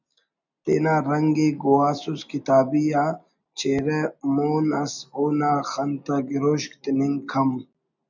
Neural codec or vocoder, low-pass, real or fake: none; 7.2 kHz; real